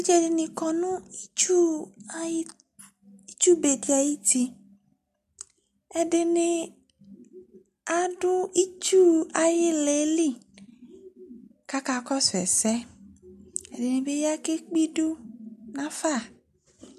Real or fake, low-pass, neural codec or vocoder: real; 14.4 kHz; none